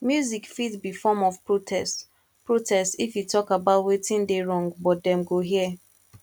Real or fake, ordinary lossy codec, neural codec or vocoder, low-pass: real; none; none; 19.8 kHz